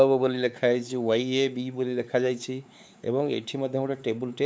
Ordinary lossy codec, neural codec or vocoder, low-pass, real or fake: none; codec, 16 kHz, 4 kbps, X-Codec, WavLM features, trained on Multilingual LibriSpeech; none; fake